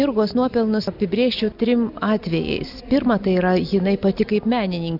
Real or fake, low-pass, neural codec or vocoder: real; 5.4 kHz; none